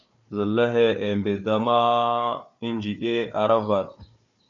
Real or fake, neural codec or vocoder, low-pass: fake; codec, 16 kHz, 4 kbps, FunCodec, trained on Chinese and English, 50 frames a second; 7.2 kHz